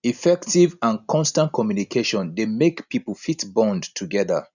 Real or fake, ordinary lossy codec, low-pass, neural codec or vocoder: real; none; 7.2 kHz; none